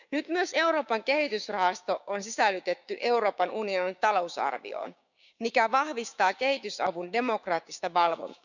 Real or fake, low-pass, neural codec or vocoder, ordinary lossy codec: fake; 7.2 kHz; codec, 16 kHz, 6 kbps, DAC; none